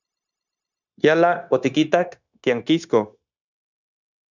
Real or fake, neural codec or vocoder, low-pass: fake; codec, 16 kHz, 0.9 kbps, LongCat-Audio-Codec; 7.2 kHz